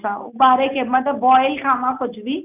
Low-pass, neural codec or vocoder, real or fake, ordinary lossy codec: 3.6 kHz; none; real; none